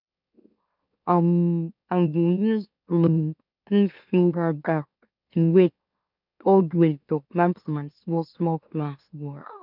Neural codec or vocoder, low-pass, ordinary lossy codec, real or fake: autoencoder, 44.1 kHz, a latent of 192 numbers a frame, MeloTTS; 5.4 kHz; none; fake